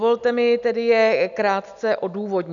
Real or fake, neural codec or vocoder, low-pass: real; none; 7.2 kHz